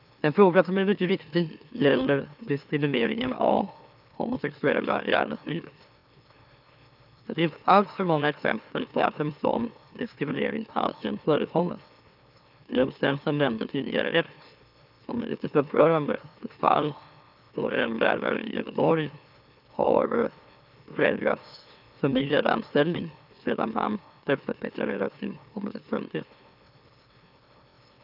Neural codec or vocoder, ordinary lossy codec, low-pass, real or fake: autoencoder, 44.1 kHz, a latent of 192 numbers a frame, MeloTTS; none; 5.4 kHz; fake